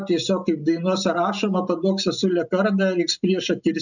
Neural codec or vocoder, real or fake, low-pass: none; real; 7.2 kHz